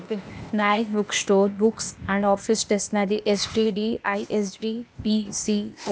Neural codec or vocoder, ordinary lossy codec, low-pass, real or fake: codec, 16 kHz, 0.8 kbps, ZipCodec; none; none; fake